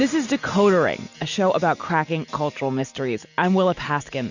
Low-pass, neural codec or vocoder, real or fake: 7.2 kHz; none; real